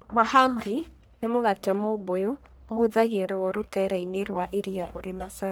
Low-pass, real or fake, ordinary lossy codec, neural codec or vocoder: none; fake; none; codec, 44.1 kHz, 1.7 kbps, Pupu-Codec